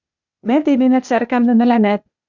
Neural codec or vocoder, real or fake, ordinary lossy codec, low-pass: codec, 16 kHz, 0.8 kbps, ZipCodec; fake; Opus, 64 kbps; 7.2 kHz